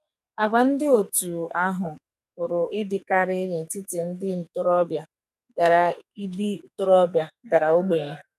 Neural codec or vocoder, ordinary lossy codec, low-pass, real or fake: codec, 44.1 kHz, 2.6 kbps, SNAC; none; 14.4 kHz; fake